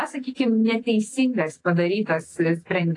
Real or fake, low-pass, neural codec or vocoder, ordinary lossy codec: fake; 10.8 kHz; autoencoder, 48 kHz, 128 numbers a frame, DAC-VAE, trained on Japanese speech; AAC, 32 kbps